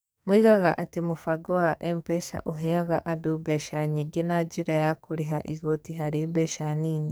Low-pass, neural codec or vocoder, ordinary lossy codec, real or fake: none; codec, 44.1 kHz, 2.6 kbps, SNAC; none; fake